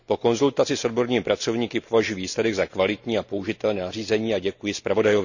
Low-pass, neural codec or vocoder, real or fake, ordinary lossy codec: 7.2 kHz; none; real; none